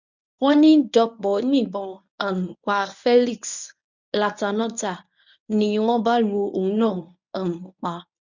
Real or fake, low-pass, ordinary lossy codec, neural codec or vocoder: fake; 7.2 kHz; none; codec, 24 kHz, 0.9 kbps, WavTokenizer, medium speech release version 1